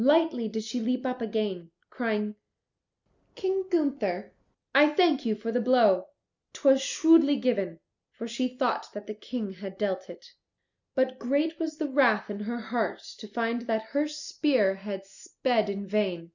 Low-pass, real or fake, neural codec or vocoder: 7.2 kHz; real; none